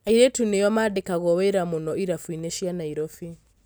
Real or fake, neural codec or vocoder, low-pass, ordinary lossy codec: real; none; none; none